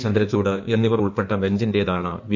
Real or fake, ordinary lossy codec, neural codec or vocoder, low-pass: fake; none; codec, 16 kHz in and 24 kHz out, 1.1 kbps, FireRedTTS-2 codec; 7.2 kHz